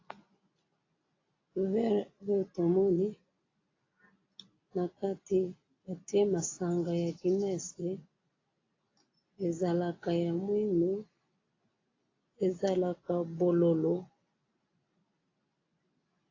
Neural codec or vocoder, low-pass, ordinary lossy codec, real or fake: none; 7.2 kHz; AAC, 32 kbps; real